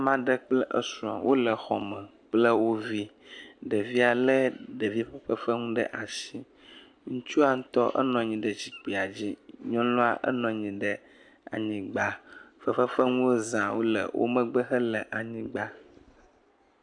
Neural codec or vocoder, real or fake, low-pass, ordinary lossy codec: none; real; 9.9 kHz; AAC, 64 kbps